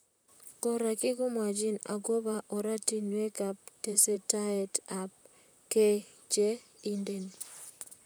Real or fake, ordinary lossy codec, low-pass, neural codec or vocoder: fake; none; none; vocoder, 44.1 kHz, 128 mel bands, Pupu-Vocoder